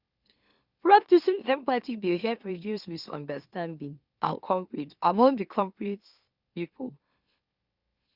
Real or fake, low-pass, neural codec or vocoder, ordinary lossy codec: fake; 5.4 kHz; autoencoder, 44.1 kHz, a latent of 192 numbers a frame, MeloTTS; Opus, 64 kbps